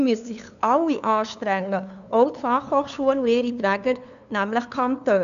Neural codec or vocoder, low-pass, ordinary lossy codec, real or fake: codec, 16 kHz, 2 kbps, FunCodec, trained on LibriTTS, 25 frames a second; 7.2 kHz; none; fake